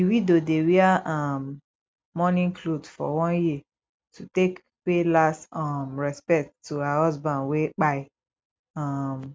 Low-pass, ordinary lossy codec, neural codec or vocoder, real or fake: none; none; none; real